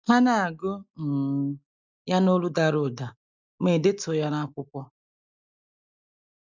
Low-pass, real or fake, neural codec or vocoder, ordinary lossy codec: 7.2 kHz; real; none; none